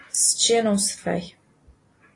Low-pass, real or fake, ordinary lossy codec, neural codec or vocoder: 10.8 kHz; real; AAC, 32 kbps; none